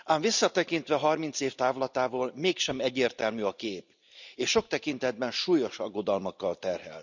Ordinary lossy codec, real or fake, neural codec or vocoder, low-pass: none; real; none; 7.2 kHz